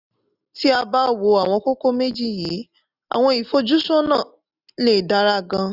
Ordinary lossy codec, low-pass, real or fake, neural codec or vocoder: none; 5.4 kHz; real; none